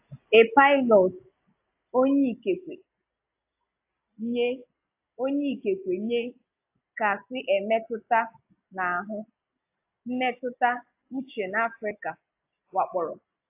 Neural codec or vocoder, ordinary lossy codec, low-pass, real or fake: none; AAC, 32 kbps; 3.6 kHz; real